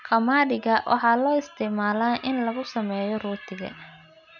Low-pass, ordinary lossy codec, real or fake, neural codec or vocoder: 7.2 kHz; none; real; none